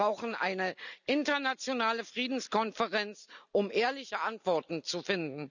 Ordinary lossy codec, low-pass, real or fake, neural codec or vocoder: none; 7.2 kHz; real; none